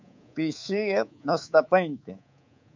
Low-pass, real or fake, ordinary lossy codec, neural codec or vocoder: 7.2 kHz; fake; MP3, 64 kbps; codec, 24 kHz, 3.1 kbps, DualCodec